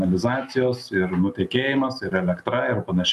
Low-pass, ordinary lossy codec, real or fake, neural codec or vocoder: 14.4 kHz; MP3, 96 kbps; real; none